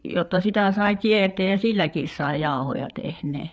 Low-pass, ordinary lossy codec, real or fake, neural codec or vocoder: none; none; fake; codec, 16 kHz, 4 kbps, FreqCodec, larger model